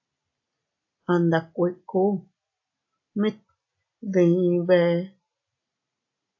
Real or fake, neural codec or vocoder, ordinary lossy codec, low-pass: real; none; AAC, 48 kbps; 7.2 kHz